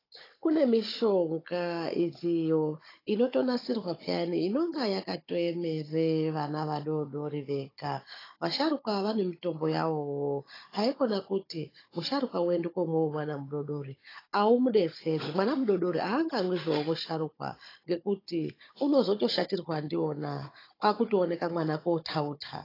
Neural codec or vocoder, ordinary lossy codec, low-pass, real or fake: codec, 16 kHz, 16 kbps, FunCodec, trained on Chinese and English, 50 frames a second; AAC, 24 kbps; 5.4 kHz; fake